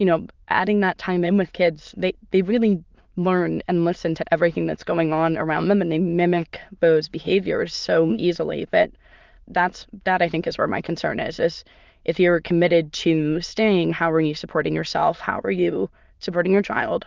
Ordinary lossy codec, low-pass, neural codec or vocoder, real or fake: Opus, 24 kbps; 7.2 kHz; autoencoder, 22.05 kHz, a latent of 192 numbers a frame, VITS, trained on many speakers; fake